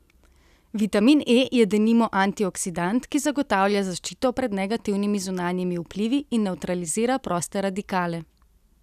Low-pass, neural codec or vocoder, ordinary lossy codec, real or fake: 14.4 kHz; none; none; real